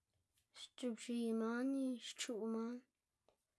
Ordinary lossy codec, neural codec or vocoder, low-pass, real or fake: none; none; none; real